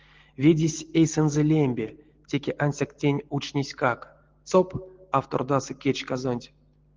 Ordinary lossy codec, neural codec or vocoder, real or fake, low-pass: Opus, 16 kbps; none; real; 7.2 kHz